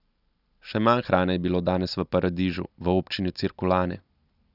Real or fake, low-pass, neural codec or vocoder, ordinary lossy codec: real; 5.4 kHz; none; none